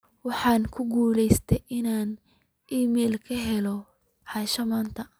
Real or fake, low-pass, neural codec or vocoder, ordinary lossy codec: real; none; none; none